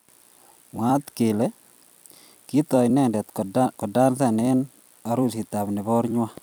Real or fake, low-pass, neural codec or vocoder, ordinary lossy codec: fake; none; vocoder, 44.1 kHz, 128 mel bands every 256 samples, BigVGAN v2; none